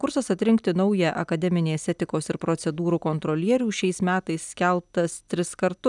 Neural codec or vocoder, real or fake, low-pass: none; real; 10.8 kHz